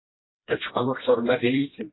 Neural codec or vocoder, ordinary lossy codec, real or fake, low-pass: codec, 16 kHz, 1 kbps, FreqCodec, smaller model; AAC, 16 kbps; fake; 7.2 kHz